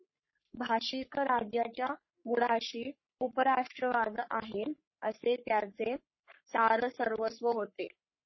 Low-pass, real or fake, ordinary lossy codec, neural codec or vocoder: 7.2 kHz; fake; MP3, 24 kbps; codec, 44.1 kHz, 3.4 kbps, Pupu-Codec